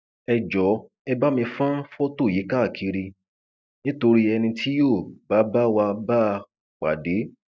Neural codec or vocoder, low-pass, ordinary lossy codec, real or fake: none; none; none; real